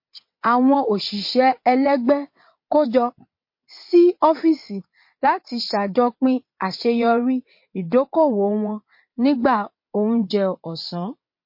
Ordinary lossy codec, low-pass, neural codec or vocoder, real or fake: MP3, 32 kbps; 5.4 kHz; vocoder, 22.05 kHz, 80 mel bands, WaveNeXt; fake